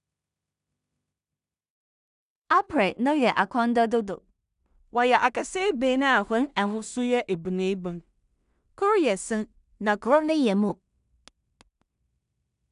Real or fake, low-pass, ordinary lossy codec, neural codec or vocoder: fake; 10.8 kHz; none; codec, 16 kHz in and 24 kHz out, 0.4 kbps, LongCat-Audio-Codec, two codebook decoder